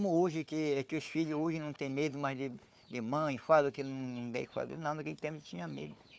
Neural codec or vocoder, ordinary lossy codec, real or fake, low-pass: codec, 16 kHz, 4 kbps, FunCodec, trained on Chinese and English, 50 frames a second; none; fake; none